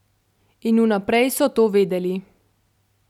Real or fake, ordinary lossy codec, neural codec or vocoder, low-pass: real; none; none; 19.8 kHz